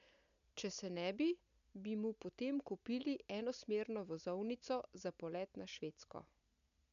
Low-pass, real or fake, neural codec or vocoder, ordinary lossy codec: 7.2 kHz; real; none; none